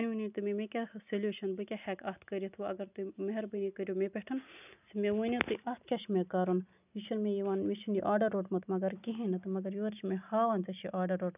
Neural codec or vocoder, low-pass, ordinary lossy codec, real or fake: none; 3.6 kHz; none; real